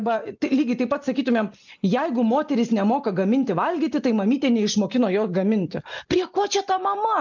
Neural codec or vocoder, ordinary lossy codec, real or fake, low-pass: none; MP3, 64 kbps; real; 7.2 kHz